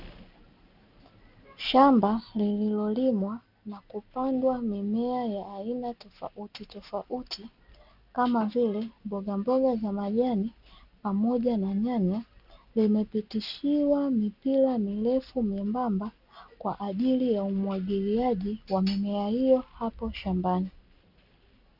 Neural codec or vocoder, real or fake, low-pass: none; real; 5.4 kHz